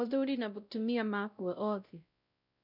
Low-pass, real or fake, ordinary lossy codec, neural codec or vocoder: 5.4 kHz; fake; none; codec, 16 kHz, 0.5 kbps, X-Codec, WavLM features, trained on Multilingual LibriSpeech